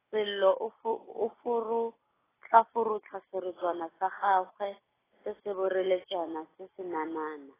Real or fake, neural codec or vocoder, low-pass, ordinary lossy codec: real; none; 3.6 kHz; AAC, 16 kbps